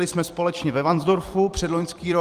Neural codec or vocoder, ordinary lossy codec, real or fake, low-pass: vocoder, 44.1 kHz, 128 mel bands every 256 samples, BigVGAN v2; Opus, 32 kbps; fake; 14.4 kHz